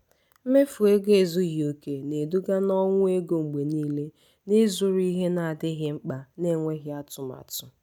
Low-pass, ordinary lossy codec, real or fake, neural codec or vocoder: 19.8 kHz; none; real; none